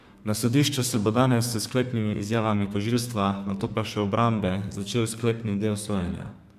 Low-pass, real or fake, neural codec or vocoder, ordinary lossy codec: 14.4 kHz; fake; codec, 32 kHz, 1.9 kbps, SNAC; MP3, 96 kbps